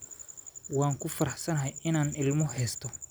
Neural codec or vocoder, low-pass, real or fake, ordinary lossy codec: vocoder, 44.1 kHz, 128 mel bands every 256 samples, BigVGAN v2; none; fake; none